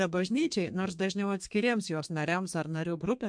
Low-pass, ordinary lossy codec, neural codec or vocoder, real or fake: 9.9 kHz; MP3, 64 kbps; codec, 32 kHz, 1.9 kbps, SNAC; fake